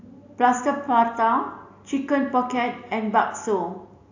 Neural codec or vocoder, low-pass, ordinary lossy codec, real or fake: none; 7.2 kHz; AAC, 48 kbps; real